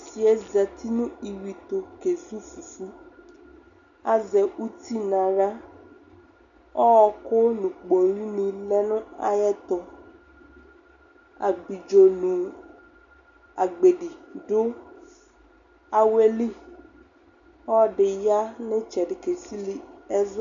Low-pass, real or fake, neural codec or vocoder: 7.2 kHz; real; none